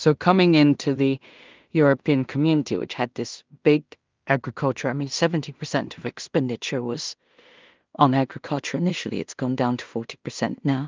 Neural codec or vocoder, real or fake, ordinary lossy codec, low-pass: codec, 16 kHz in and 24 kHz out, 0.4 kbps, LongCat-Audio-Codec, two codebook decoder; fake; Opus, 24 kbps; 7.2 kHz